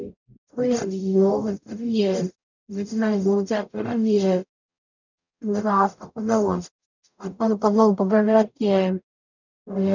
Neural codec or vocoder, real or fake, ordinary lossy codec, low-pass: codec, 44.1 kHz, 0.9 kbps, DAC; fake; none; 7.2 kHz